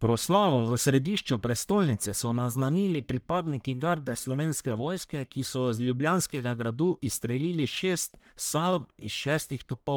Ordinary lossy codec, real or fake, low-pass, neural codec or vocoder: none; fake; none; codec, 44.1 kHz, 1.7 kbps, Pupu-Codec